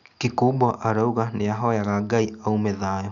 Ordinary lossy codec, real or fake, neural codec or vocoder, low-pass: none; real; none; 7.2 kHz